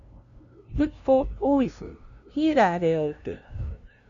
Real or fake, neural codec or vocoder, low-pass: fake; codec, 16 kHz, 0.5 kbps, FunCodec, trained on LibriTTS, 25 frames a second; 7.2 kHz